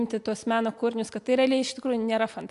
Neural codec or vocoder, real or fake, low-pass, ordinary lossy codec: none; real; 10.8 kHz; AAC, 64 kbps